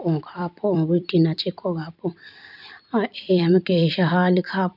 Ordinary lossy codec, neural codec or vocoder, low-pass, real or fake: none; vocoder, 44.1 kHz, 128 mel bands every 256 samples, BigVGAN v2; 5.4 kHz; fake